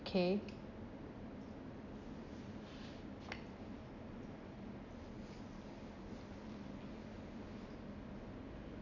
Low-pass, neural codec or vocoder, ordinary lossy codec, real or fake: 7.2 kHz; none; none; real